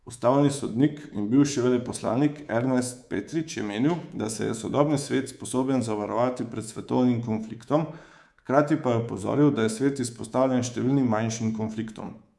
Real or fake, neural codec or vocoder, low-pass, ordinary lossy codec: fake; codec, 24 kHz, 3.1 kbps, DualCodec; none; none